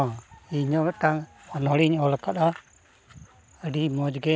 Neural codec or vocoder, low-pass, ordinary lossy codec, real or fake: none; none; none; real